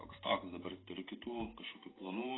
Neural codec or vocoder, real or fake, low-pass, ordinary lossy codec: vocoder, 44.1 kHz, 128 mel bands every 512 samples, BigVGAN v2; fake; 7.2 kHz; AAC, 16 kbps